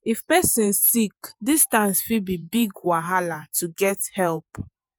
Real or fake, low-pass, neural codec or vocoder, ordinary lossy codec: fake; none; vocoder, 48 kHz, 128 mel bands, Vocos; none